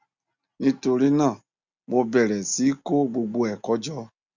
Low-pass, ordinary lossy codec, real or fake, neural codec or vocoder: 7.2 kHz; Opus, 64 kbps; real; none